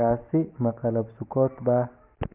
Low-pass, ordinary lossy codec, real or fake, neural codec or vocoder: 3.6 kHz; Opus, 32 kbps; real; none